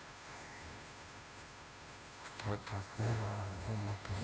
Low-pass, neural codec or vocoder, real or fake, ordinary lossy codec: none; codec, 16 kHz, 0.5 kbps, FunCodec, trained on Chinese and English, 25 frames a second; fake; none